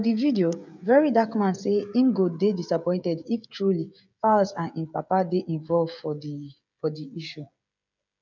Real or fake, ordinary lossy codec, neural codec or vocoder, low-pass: fake; none; codec, 16 kHz, 16 kbps, FreqCodec, smaller model; 7.2 kHz